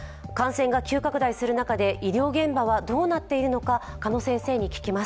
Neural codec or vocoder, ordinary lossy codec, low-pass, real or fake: none; none; none; real